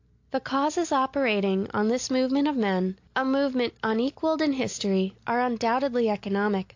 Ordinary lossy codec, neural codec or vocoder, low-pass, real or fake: AAC, 48 kbps; none; 7.2 kHz; real